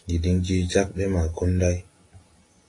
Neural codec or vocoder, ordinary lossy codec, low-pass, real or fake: none; AAC, 32 kbps; 10.8 kHz; real